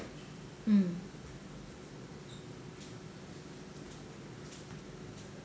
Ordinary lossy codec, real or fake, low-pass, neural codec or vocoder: none; real; none; none